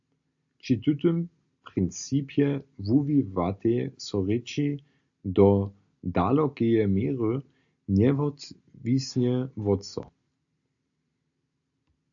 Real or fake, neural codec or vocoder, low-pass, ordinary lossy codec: real; none; 7.2 kHz; AAC, 64 kbps